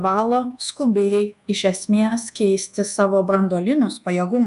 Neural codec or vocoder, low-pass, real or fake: codec, 24 kHz, 1.2 kbps, DualCodec; 10.8 kHz; fake